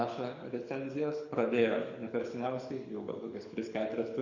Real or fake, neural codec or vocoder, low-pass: fake; codec, 24 kHz, 6 kbps, HILCodec; 7.2 kHz